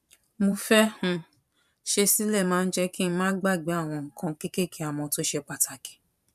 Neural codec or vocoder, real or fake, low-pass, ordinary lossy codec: vocoder, 44.1 kHz, 128 mel bands every 512 samples, BigVGAN v2; fake; 14.4 kHz; none